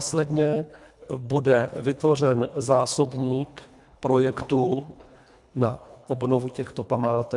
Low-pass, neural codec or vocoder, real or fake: 10.8 kHz; codec, 24 kHz, 1.5 kbps, HILCodec; fake